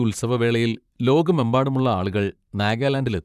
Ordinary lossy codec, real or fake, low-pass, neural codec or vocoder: none; fake; 14.4 kHz; vocoder, 44.1 kHz, 128 mel bands, Pupu-Vocoder